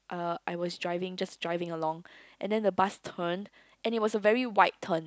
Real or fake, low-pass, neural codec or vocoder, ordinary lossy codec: real; none; none; none